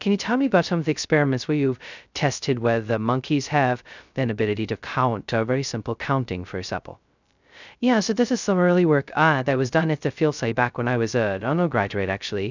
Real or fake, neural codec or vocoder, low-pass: fake; codec, 16 kHz, 0.2 kbps, FocalCodec; 7.2 kHz